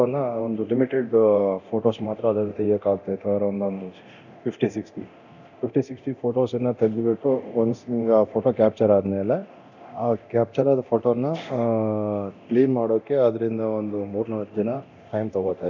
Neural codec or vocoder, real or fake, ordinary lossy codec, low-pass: codec, 24 kHz, 0.9 kbps, DualCodec; fake; none; 7.2 kHz